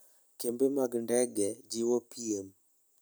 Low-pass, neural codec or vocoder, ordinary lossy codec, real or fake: none; none; none; real